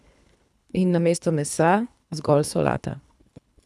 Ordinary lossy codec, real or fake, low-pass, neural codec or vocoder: none; fake; none; codec, 24 kHz, 3 kbps, HILCodec